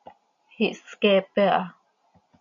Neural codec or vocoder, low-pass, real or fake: none; 7.2 kHz; real